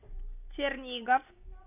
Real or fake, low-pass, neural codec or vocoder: real; 3.6 kHz; none